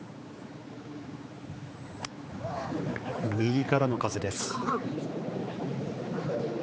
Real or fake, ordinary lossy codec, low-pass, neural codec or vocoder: fake; none; none; codec, 16 kHz, 4 kbps, X-Codec, HuBERT features, trained on general audio